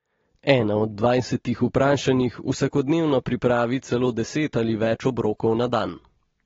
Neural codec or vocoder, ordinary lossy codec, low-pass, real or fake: none; AAC, 24 kbps; 7.2 kHz; real